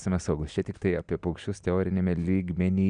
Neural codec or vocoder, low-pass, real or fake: none; 9.9 kHz; real